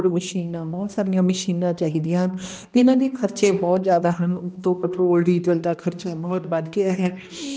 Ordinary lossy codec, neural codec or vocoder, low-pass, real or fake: none; codec, 16 kHz, 1 kbps, X-Codec, HuBERT features, trained on balanced general audio; none; fake